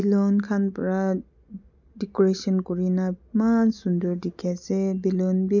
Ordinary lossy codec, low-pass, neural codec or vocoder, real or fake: none; 7.2 kHz; none; real